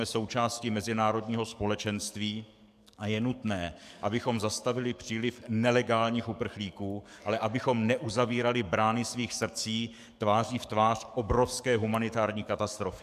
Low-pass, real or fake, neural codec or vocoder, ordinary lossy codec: 14.4 kHz; fake; codec, 44.1 kHz, 7.8 kbps, DAC; MP3, 96 kbps